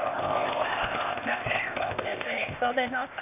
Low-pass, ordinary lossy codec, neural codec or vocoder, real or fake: 3.6 kHz; none; codec, 16 kHz, 0.8 kbps, ZipCodec; fake